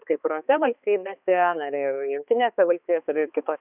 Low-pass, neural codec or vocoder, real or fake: 3.6 kHz; codec, 16 kHz, 2 kbps, X-Codec, HuBERT features, trained on balanced general audio; fake